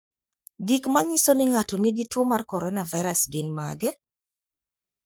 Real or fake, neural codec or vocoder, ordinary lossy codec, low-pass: fake; codec, 44.1 kHz, 3.4 kbps, Pupu-Codec; none; none